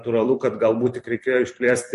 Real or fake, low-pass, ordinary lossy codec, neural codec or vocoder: fake; 14.4 kHz; MP3, 48 kbps; vocoder, 44.1 kHz, 128 mel bands every 256 samples, BigVGAN v2